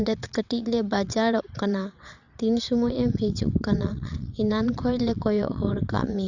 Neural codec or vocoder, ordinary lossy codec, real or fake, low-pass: vocoder, 22.05 kHz, 80 mel bands, WaveNeXt; Opus, 64 kbps; fake; 7.2 kHz